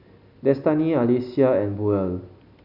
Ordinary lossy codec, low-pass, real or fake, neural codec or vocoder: Opus, 64 kbps; 5.4 kHz; real; none